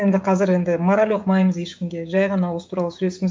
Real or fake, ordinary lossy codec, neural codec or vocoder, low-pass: fake; none; codec, 16 kHz, 16 kbps, FreqCodec, smaller model; none